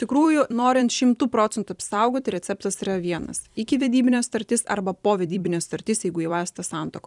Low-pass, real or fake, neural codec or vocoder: 10.8 kHz; fake; vocoder, 44.1 kHz, 128 mel bands every 256 samples, BigVGAN v2